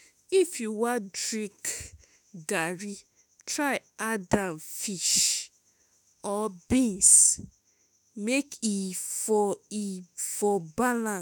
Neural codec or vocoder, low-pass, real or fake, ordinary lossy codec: autoencoder, 48 kHz, 32 numbers a frame, DAC-VAE, trained on Japanese speech; none; fake; none